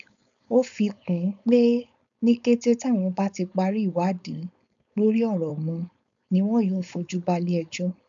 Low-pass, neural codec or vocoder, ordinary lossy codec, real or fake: 7.2 kHz; codec, 16 kHz, 4.8 kbps, FACodec; none; fake